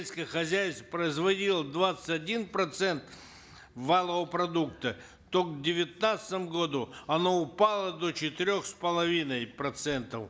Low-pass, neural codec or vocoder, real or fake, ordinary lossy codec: none; none; real; none